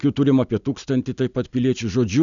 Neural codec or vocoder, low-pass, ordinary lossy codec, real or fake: none; 7.2 kHz; MP3, 96 kbps; real